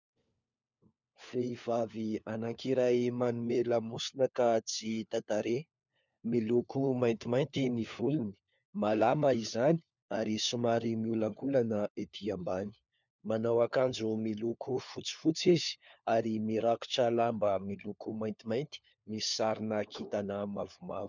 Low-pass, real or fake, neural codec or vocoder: 7.2 kHz; fake; codec, 16 kHz, 4 kbps, FunCodec, trained on LibriTTS, 50 frames a second